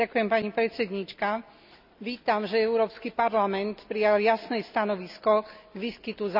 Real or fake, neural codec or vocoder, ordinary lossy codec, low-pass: real; none; none; 5.4 kHz